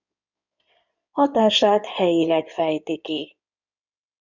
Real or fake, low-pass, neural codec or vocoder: fake; 7.2 kHz; codec, 16 kHz in and 24 kHz out, 2.2 kbps, FireRedTTS-2 codec